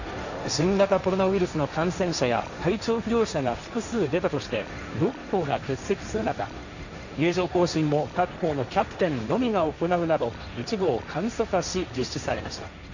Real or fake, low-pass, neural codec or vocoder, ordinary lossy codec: fake; 7.2 kHz; codec, 16 kHz, 1.1 kbps, Voila-Tokenizer; none